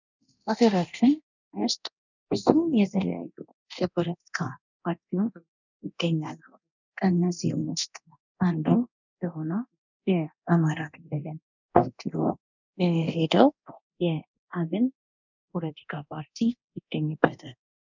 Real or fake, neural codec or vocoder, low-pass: fake; codec, 24 kHz, 0.9 kbps, DualCodec; 7.2 kHz